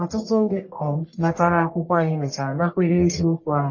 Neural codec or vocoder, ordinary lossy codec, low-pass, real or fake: codec, 44.1 kHz, 1.7 kbps, Pupu-Codec; MP3, 32 kbps; 7.2 kHz; fake